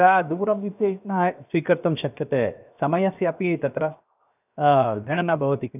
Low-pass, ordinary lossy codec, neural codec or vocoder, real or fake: 3.6 kHz; none; codec, 16 kHz, 0.7 kbps, FocalCodec; fake